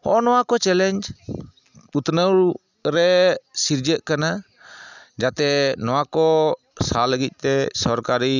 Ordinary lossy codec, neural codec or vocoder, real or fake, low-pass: none; none; real; 7.2 kHz